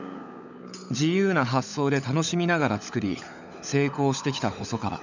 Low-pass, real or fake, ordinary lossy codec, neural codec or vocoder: 7.2 kHz; fake; none; codec, 16 kHz, 16 kbps, FunCodec, trained on LibriTTS, 50 frames a second